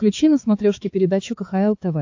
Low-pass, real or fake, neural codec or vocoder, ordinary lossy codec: 7.2 kHz; fake; codec, 16 kHz, 4 kbps, X-Codec, HuBERT features, trained on balanced general audio; AAC, 48 kbps